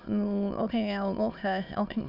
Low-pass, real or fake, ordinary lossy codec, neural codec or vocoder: 5.4 kHz; fake; none; autoencoder, 22.05 kHz, a latent of 192 numbers a frame, VITS, trained on many speakers